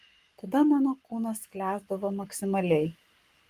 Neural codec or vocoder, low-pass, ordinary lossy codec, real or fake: codec, 44.1 kHz, 7.8 kbps, Pupu-Codec; 14.4 kHz; Opus, 32 kbps; fake